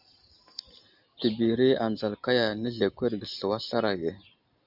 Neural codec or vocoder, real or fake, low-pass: none; real; 5.4 kHz